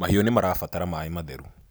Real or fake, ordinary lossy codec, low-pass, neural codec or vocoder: real; none; none; none